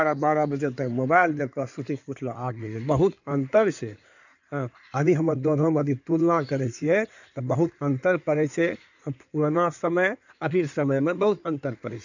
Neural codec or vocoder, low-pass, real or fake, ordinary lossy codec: codec, 16 kHz in and 24 kHz out, 2.2 kbps, FireRedTTS-2 codec; 7.2 kHz; fake; none